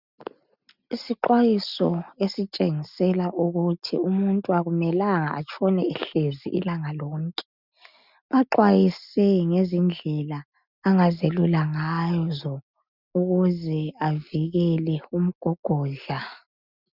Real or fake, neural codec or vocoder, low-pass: real; none; 5.4 kHz